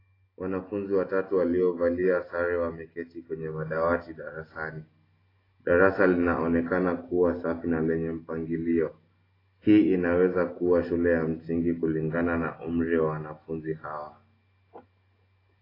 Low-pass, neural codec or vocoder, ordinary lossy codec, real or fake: 5.4 kHz; none; AAC, 24 kbps; real